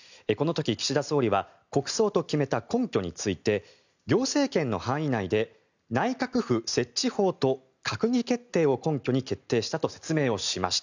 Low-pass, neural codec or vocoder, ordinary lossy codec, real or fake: 7.2 kHz; none; none; real